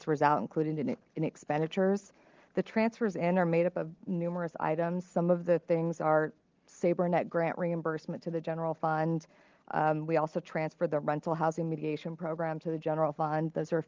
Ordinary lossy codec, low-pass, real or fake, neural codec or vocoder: Opus, 32 kbps; 7.2 kHz; real; none